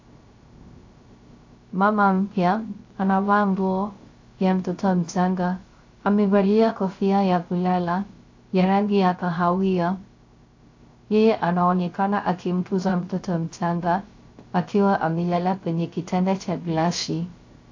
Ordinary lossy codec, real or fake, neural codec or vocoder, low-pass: AAC, 48 kbps; fake; codec, 16 kHz, 0.3 kbps, FocalCodec; 7.2 kHz